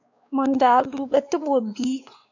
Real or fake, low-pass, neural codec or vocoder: fake; 7.2 kHz; codec, 16 kHz, 2 kbps, X-Codec, WavLM features, trained on Multilingual LibriSpeech